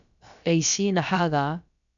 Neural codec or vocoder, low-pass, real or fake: codec, 16 kHz, about 1 kbps, DyCAST, with the encoder's durations; 7.2 kHz; fake